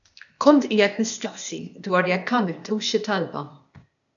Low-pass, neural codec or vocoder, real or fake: 7.2 kHz; codec, 16 kHz, 0.8 kbps, ZipCodec; fake